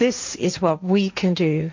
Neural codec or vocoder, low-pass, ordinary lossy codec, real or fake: codec, 16 kHz, 1.1 kbps, Voila-Tokenizer; 7.2 kHz; MP3, 48 kbps; fake